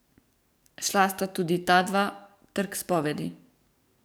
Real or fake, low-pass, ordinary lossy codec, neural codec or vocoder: fake; none; none; codec, 44.1 kHz, 7.8 kbps, DAC